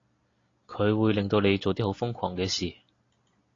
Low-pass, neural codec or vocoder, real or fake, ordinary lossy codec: 7.2 kHz; none; real; AAC, 32 kbps